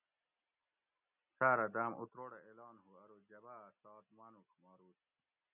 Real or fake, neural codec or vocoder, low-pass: real; none; 3.6 kHz